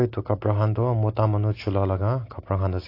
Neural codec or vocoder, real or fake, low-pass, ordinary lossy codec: none; real; 5.4 kHz; AAC, 32 kbps